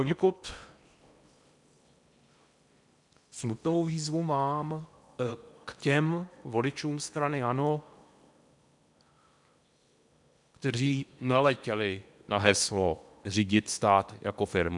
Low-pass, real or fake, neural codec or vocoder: 10.8 kHz; fake; codec, 16 kHz in and 24 kHz out, 0.8 kbps, FocalCodec, streaming, 65536 codes